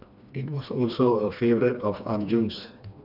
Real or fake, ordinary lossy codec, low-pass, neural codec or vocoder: fake; none; 5.4 kHz; codec, 16 kHz, 2 kbps, FreqCodec, smaller model